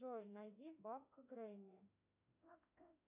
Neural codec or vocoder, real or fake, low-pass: autoencoder, 48 kHz, 32 numbers a frame, DAC-VAE, trained on Japanese speech; fake; 3.6 kHz